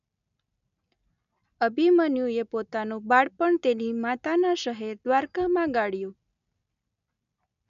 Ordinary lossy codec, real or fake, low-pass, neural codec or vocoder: none; real; 7.2 kHz; none